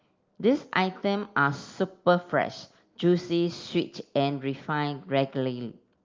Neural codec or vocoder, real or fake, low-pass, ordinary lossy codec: none; real; 7.2 kHz; Opus, 32 kbps